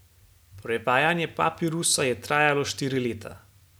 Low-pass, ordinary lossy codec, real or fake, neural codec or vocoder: none; none; real; none